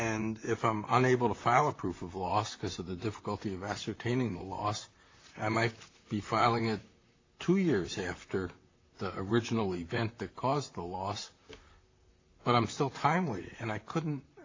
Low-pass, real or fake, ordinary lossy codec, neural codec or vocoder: 7.2 kHz; fake; AAC, 32 kbps; vocoder, 44.1 kHz, 128 mel bands, Pupu-Vocoder